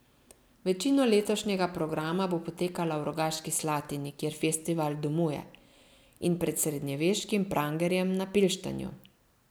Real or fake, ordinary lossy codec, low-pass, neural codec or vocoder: real; none; none; none